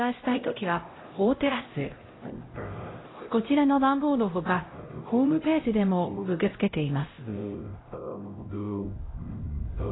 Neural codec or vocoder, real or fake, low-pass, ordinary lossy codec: codec, 16 kHz, 0.5 kbps, X-Codec, HuBERT features, trained on LibriSpeech; fake; 7.2 kHz; AAC, 16 kbps